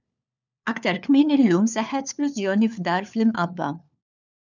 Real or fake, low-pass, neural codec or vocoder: fake; 7.2 kHz; codec, 16 kHz, 4 kbps, FunCodec, trained on LibriTTS, 50 frames a second